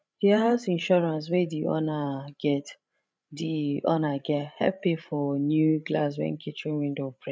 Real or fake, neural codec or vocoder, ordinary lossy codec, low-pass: fake; codec, 16 kHz, 16 kbps, FreqCodec, larger model; none; none